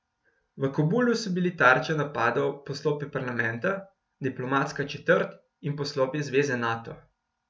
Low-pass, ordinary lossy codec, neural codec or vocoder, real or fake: none; none; none; real